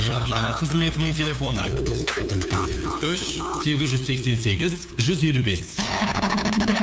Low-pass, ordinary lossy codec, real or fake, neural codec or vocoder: none; none; fake; codec, 16 kHz, 2 kbps, FunCodec, trained on LibriTTS, 25 frames a second